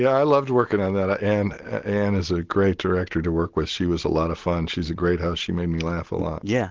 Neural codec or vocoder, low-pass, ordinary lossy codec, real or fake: none; 7.2 kHz; Opus, 16 kbps; real